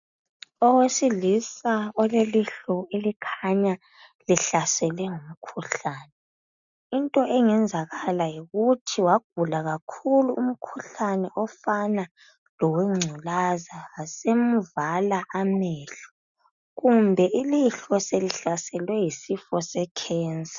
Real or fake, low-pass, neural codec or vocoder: real; 7.2 kHz; none